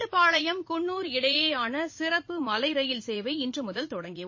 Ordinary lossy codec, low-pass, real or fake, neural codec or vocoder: MP3, 32 kbps; 7.2 kHz; fake; vocoder, 44.1 kHz, 80 mel bands, Vocos